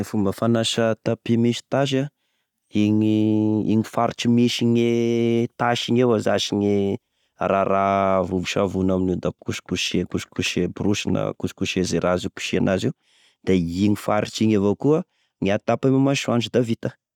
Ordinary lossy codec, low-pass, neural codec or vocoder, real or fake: none; 19.8 kHz; none; real